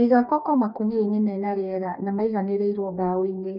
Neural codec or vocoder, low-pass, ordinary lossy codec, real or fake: codec, 44.1 kHz, 2.6 kbps, DAC; 5.4 kHz; none; fake